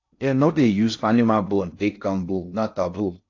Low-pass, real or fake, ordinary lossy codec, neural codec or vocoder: 7.2 kHz; fake; AAC, 48 kbps; codec, 16 kHz in and 24 kHz out, 0.6 kbps, FocalCodec, streaming, 4096 codes